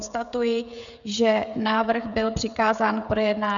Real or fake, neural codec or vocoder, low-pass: fake; codec, 16 kHz, 16 kbps, FreqCodec, smaller model; 7.2 kHz